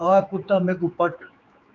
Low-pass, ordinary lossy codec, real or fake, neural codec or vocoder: 7.2 kHz; MP3, 96 kbps; fake; codec, 16 kHz, 4 kbps, X-Codec, HuBERT features, trained on general audio